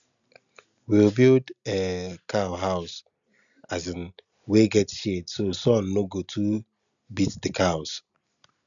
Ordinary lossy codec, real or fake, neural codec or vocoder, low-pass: none; real; none; 7.2 kHz